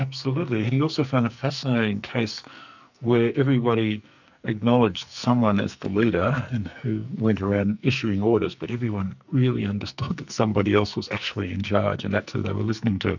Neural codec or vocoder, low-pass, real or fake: codec, 44.1 kHz, 2.6 kbps, SNAC; 7.2 kHz; fake